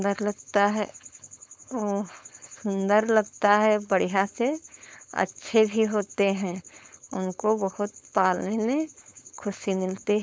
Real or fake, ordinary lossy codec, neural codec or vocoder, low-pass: fake; none; codec, 16 kHz, 4.8 kbps, FACodec; none